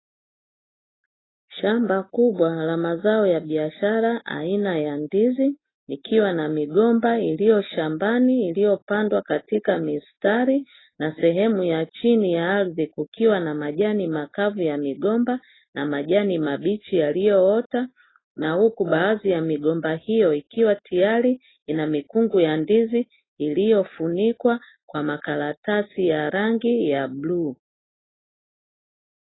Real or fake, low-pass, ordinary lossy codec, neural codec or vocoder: real; 7.2 kHz; AAC, 16 kbps; none